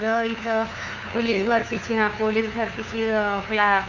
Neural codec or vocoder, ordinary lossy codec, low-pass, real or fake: codec, 16 kHz, 2 kbps, FunCodec, trained on LibriTTS, 25 frames a second; none; 7.2 kHz; fake